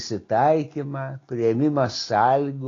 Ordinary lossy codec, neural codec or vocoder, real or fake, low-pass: AAC, 32 kbps; none; real; 7.2 kHz